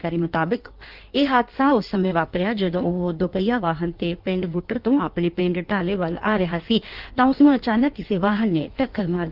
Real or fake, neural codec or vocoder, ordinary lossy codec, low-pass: fake; codec, 16 kHz in and 24 kHz out, 1.1 kbps, FireRedTTS-2 codec; Opus, 24 kbps; 5.4 kHz